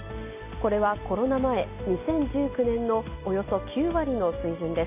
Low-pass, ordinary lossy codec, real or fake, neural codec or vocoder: 3.6 kHz; MP3, 24 kbps; real; none